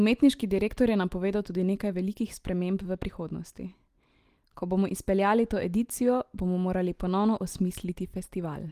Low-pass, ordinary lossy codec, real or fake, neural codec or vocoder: 14.4 kHz; Opus, 24 kbps; real; none